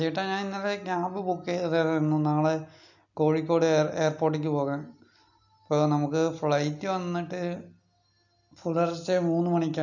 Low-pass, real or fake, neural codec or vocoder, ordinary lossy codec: 7.2 kHz; real; none; none